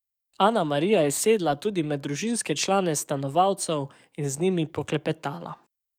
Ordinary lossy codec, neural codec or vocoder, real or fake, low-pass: none; codec, 44.1 kHz, 7.8 kbps, DAC; fake; 19.8 kHz